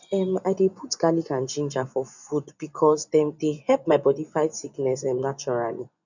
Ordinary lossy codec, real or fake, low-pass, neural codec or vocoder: none; fake; 7.2 kHz; vocoder, 24 kHz, 100 mel bands, Vocos